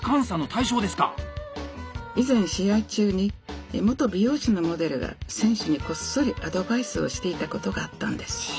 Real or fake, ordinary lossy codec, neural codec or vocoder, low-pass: real; none; none; none